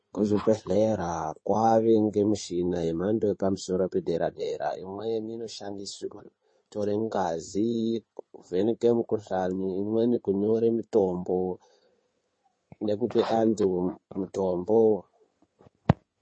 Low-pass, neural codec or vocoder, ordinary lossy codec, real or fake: 9.9 kHz; codec, 16 kHz in and 24 kHz out, 2.2 kbps, FireRedTTS-2 codec; MP3, 32 kbps; fake